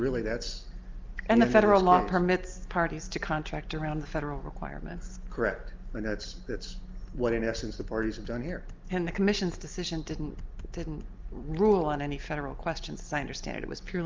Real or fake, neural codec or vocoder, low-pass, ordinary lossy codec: real; none; 7.2 kHz; Opus, 24 kbps